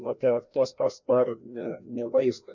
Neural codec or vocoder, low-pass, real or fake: codec, 16 kHz, 1 kbps, FreqCodec, larger model; 7.2 kHz; fake